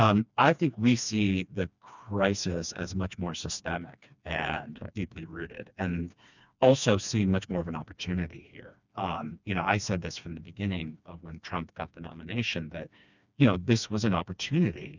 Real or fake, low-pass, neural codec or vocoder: fake; 7.2 kHz; codec, 16 kHz, 2 kbps, FreqCodec, smaller model